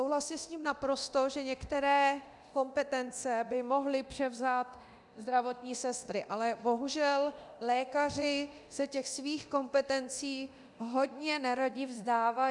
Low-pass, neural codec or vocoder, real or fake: 10.8 kHz; codec, 24 kHz, 0.9 kbps, DualCodec; fake